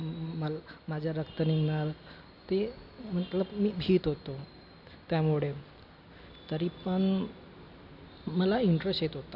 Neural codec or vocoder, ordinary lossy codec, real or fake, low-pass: none; AAC, 48 kbps; real; 5.4 kHz